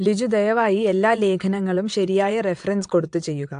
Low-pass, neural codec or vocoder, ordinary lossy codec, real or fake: 9.9 kHz; vocoder, 22.05 kHz, 80 mel bands, WaveNeXt; AAC, 64 kbps; fake